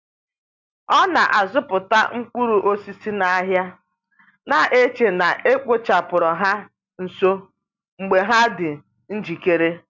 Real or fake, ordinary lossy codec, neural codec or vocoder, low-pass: real; MP3, 64 kbps; none; 7.2 kHz